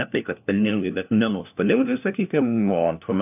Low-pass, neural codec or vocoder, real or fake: 3.6 kHz; codec, 16 kHz, 1 kbps, FunCodec, trained on LibriTTS, 50 frames a second; fake